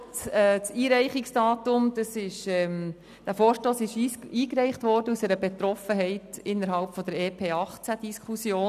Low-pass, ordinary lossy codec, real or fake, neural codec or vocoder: 14.4 kHz; none; real; none